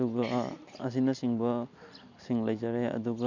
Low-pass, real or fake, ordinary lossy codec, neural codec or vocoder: 7.2 kHz; real; none; none